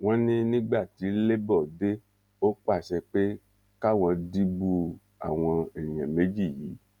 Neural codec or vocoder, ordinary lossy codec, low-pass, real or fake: none; none; 19.8 kHz; real